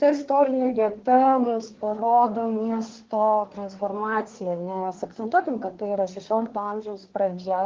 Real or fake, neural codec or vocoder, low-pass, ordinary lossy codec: fake; codec, 24 kHz, 1 kbps, SNAC; 7.2 kHz; Opus, 16 kbps